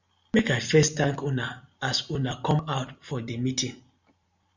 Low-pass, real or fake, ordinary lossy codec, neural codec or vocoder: 7.2 kHz; real; Opus, 64 kbps; none